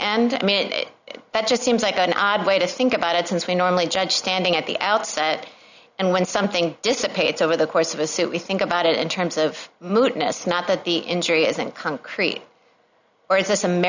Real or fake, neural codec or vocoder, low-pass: real; none; 7.2 kHz